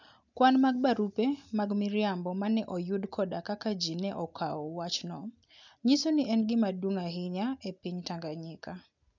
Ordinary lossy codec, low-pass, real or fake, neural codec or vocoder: none; 7.2 kHz; real; none